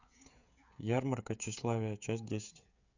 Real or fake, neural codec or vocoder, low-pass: fake; codec, 16 kHz, 16 kbps, FreqCodec, smaller model; 7.2 kHz